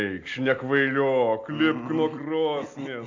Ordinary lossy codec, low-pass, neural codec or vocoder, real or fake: AAC, 48 kbps; 7.2 kHz; none; real